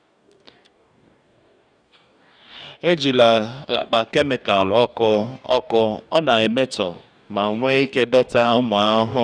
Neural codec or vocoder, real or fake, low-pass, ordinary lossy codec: codec, 44.1 kHz, 2.6 kbps, DAC; fake; 9.9 kHz; none